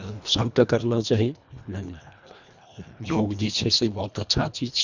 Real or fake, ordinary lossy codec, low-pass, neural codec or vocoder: fake; none; 7.2 kHz; codec, 24 kHz, 1.5 kbps, HILCodec